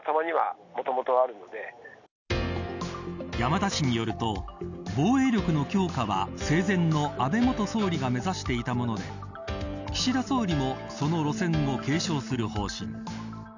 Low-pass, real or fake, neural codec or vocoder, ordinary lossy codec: 7.2 kHz; real; none; none